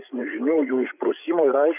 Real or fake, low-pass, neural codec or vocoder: fake; 3.6 kHz; codec, 16 kHz, 8 kbps, FreqCodec, larger model